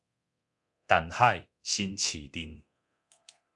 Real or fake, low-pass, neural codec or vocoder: fake; 10.8 kHz; codec, 24 kHz, 0.9 kbps, DualCodec